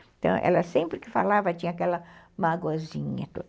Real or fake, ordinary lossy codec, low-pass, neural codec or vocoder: real; none; none; none